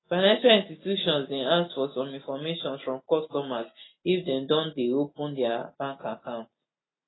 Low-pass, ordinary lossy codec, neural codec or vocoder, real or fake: 7.2 kHz; AAC, 16 kbps; none; real